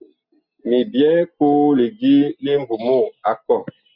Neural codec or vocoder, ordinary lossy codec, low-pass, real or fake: none; Opus, 64 kbps; 5.4 kHz; real